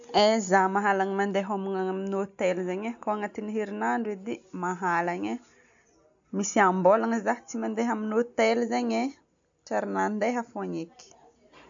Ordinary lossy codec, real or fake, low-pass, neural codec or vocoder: AAC, 64 kbps; real; 7.2 kHz; none